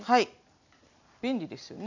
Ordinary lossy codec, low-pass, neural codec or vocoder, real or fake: none; 7.2 kHz; none; real